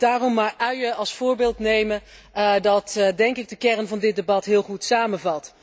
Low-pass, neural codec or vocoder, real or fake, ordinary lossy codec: none; none; real; none